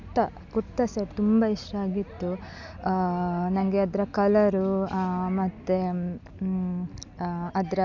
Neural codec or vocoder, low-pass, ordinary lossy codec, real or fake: none; 7.2 kHz; none; real